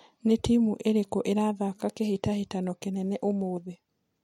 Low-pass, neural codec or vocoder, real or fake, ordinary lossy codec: 19.8 kHz; none; real; MP3, 64 kbps